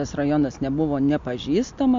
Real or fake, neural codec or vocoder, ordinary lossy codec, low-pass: real; none; MP3, 48 kbps; 7.2 kHz